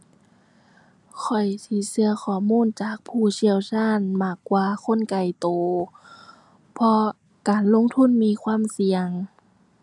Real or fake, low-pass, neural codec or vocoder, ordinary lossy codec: real; 10.8 kHz; none; none